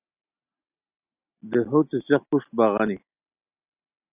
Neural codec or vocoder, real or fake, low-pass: none; real; 3.6 kHz